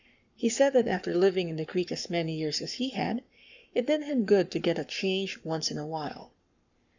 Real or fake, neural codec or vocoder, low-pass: fake; codec, 44.1 kHz, 7.8 kbps, Pupu-Codec; 7.2 kHz